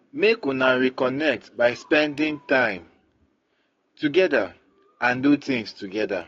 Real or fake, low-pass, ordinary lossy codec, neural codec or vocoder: fake; 7.2 kHz; AAC, 32 kbps; codec, 16 kHz, 6 kbps, DAC